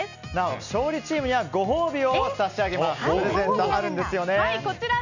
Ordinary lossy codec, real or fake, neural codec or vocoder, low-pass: none; real; none; 7.2 kHz